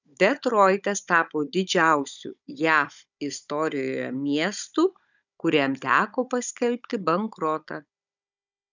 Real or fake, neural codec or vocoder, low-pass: fake; codec, 16 kHz, 16 kbps, FunCodec, trained on Chinese and English, 50 frames a second; 7.2 kHz